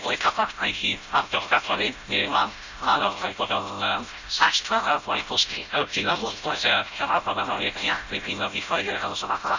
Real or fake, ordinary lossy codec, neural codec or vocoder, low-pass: fake; Opus, 64 kbps; codec, 16 kHz, 0.5 kbps, FreqCodec, smaller model; 7.2 kHz